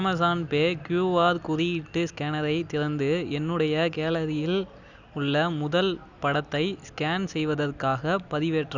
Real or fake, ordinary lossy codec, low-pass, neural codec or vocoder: real; none; 7.2 kHz; none